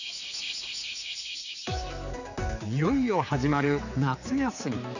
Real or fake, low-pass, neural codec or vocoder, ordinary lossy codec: fake; 7.2 kHz; codec, 16 kHz, 4 kbps, X-Codec, HuBERT features, trained on general audio; none